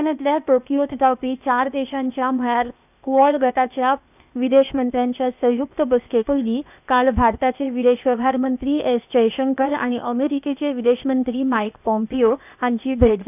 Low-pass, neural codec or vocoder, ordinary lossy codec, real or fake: 3.6 kHz; codec, 16 kHz, 0.8 kbps, ZipCodec; none; fake